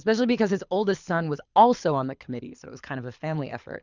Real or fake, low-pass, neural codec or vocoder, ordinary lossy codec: fake; 7.2 kHz; codec, 24 kHz, 6 kbps, HILCodec; Opus, 64 kbps